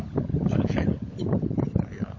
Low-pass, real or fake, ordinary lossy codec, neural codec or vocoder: 7.2 kHz; fake; MP3, 32 kbps; codec, 16 kHz, 16 kbps, FunCodec, trained on LibriTTS, 50 frames a second